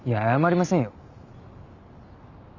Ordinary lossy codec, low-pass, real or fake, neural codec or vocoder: none; 7.2 kHz; real; none